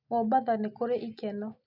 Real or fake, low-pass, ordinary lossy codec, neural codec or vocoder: real; 5.4 kHz; none; none